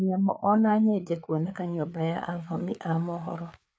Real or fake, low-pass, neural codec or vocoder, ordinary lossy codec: fake; none; codec, 16 kHz, 4 kbps, FreqCodec, larger model; none